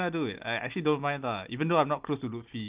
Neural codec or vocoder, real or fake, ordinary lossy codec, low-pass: none; real; Opus, 32 kbps; 3.6 kHz